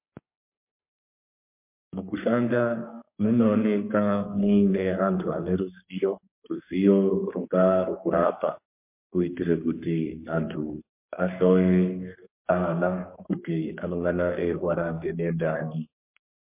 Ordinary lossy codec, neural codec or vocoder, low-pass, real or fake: MP3, 24 kbps; codec, 32 kHz, 1.9 kbps, SNAC; 3.6 kHz; fake